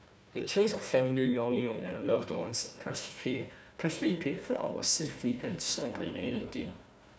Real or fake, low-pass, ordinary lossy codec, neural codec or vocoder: fake; none; none; codec, 16 kHz, 1 kbps, FunCodec, trained on Chinese and English, 50 frames a second